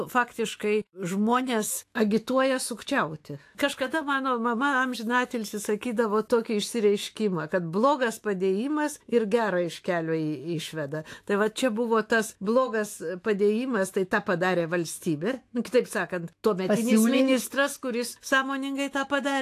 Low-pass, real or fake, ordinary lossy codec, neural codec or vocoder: 14.4 kHz; fake; AAC, 64 kbps; autoencoder, 48 kHz, 128 numbers a frame, DAC-VAE, trained on Japanese speech